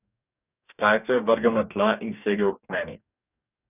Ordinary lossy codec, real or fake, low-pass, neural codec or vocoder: none; fake; 3.6 kHz; codec, 44.1 kHz, 2.6 kbps, DAC